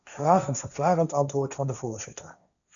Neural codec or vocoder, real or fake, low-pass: codec, 16 kHz, 1.1 kbps, Voila-Tokenizer; fake; 7.2 kHz